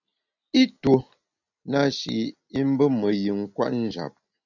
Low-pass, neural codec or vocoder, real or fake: 7.2 kHz; none; real